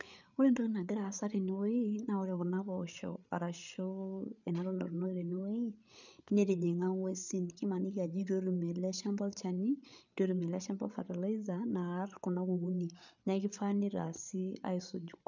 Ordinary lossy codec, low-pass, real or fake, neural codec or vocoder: none; 7.2 kHz; fake; codec, 16 kHz, 8 kbps, FreqCodec, larger model